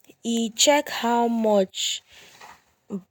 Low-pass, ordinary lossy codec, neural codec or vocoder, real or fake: none; none; none; real